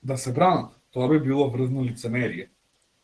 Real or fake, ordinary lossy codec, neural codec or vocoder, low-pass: real; Opus, 16 kbps; none; 10.8 kHz